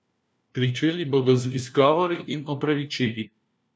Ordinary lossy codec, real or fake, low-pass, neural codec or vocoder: none; fake; none; codec, 16 kHz, 1 kbps, FunCodec, trained on LibriTTS, 50 frames a second